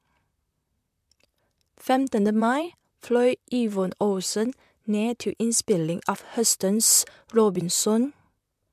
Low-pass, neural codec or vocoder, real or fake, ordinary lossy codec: 14.4 kHz; vocoder, 44.1 kHz, 128 mel bands, Pupu-Vocoder; fake; MP3, 96 kbps